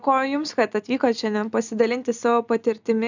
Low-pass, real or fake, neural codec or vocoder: 7.2 kHz; real; none